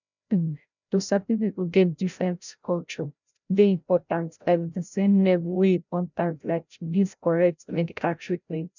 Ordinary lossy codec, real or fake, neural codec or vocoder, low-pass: none; fake; codec, 16 kHz, 0.5 kbps, FreqCodec, larger model; 7.2 kHz